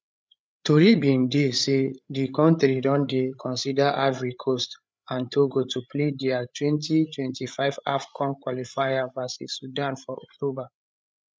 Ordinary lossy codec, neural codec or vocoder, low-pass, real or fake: none; codec, 16 kHz, 8 kbps, FreqCodec, larger model; none; fake